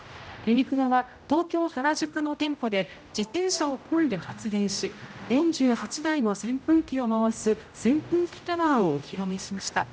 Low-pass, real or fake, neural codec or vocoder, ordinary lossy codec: none; fake; codec, 16 kHz, 0.5 kbps, X-Codec, HuBERT features, trained on general audio; none